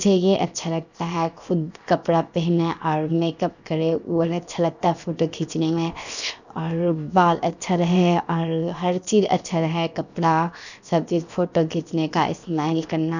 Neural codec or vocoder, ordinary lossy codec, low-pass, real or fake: codec, 16 kHz, 0.7 kbps, FocalCodec; none; 7.2 kHz; fake